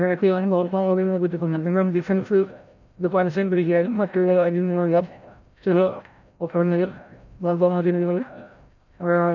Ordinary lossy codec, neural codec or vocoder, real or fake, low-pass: none; codec, 16 kHz, 0.5 kbps, FreqCodec, larger model; fake; 7.2 kHz